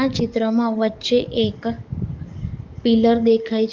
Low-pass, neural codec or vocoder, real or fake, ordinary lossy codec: 7.2 kHz; codec, 44.1 kHz, 7.8 kbps, DAC; fake; Opus, 24 kbps